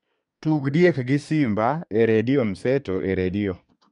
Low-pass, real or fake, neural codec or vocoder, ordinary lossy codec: 10.8 kHz; fake; codec, 24 kHz, 1 kbps, SNAC; none